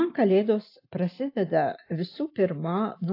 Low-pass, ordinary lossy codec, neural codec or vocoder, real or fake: 5.4 kHz; AAC, 32 kbps; none; real